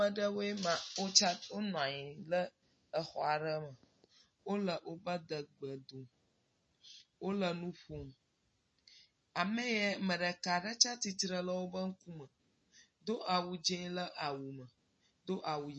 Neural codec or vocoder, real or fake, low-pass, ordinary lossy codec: none; real; 10.8 kHz; MP3, 32 kbps